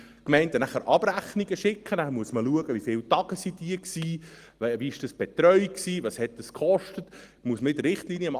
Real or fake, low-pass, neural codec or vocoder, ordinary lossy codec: real; 14.4 kHz; none; Opus, 24 kbps